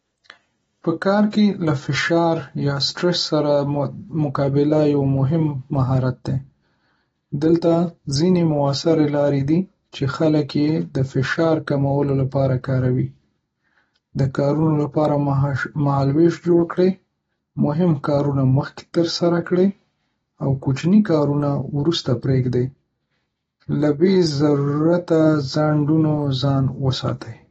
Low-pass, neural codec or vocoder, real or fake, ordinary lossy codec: 19.8 kHz; none; real; AAC, 24 kbps